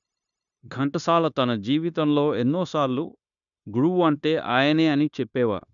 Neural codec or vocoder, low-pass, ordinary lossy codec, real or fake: codec, 16 kHz, 0.9 kbps, LongCat-Audio-Codec; 7.2 kHz; none; fake